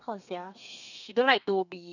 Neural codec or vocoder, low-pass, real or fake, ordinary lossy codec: codec, 32 kHz, 1.9 kbps, SNAC; 7.2 kHz; fake; none